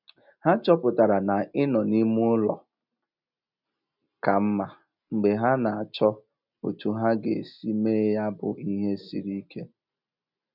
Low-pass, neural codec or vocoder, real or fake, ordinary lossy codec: 5.4 kHz; none; real; none